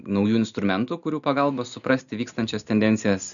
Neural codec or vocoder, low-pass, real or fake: none; 7.2 kHz; real